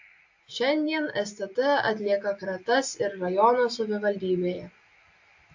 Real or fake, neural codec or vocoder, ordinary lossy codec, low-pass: real; none; AAC, 48 kbps; 7.2 kHz